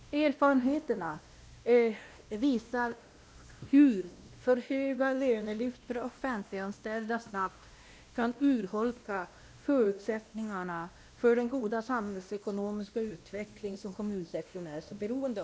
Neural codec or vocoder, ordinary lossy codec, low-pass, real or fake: codec, 16 kHz, 1 kbps, X-Codec, WavLM features, trained on Multilingual LibriSpeech; none; none; fake